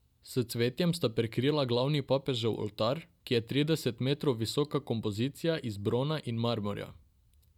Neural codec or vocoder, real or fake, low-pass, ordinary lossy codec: none; real; 19.8 kHz; none